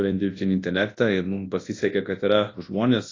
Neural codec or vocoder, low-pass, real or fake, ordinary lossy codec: codec, 24 kHz, 0.9 kbps, WavTokenizer, large speech release; 7.2 kHz; fake; AAC, 32 kbps